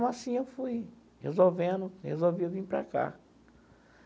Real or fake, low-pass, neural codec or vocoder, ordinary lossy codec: real; none; none; none